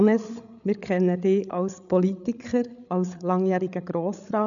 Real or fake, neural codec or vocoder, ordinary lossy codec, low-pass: fake; codec, 16 kHz, 8 kbps, FreqCodec, larger model; none; 7.2 kHz